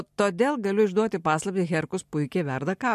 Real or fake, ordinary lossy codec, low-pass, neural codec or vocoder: real; MP3, 64 kbps; 14.4 kHz; none